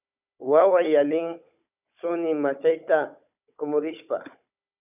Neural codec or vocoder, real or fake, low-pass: codec, 16 kHz, 16 kbps, FunCodec, trained on Chinese and English, 50 frames a second; fake; 3.6 kHz